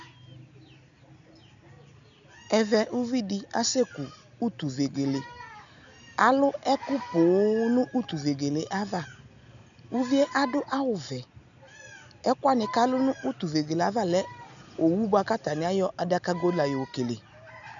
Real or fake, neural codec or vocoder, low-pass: real; none; 7.2 kHz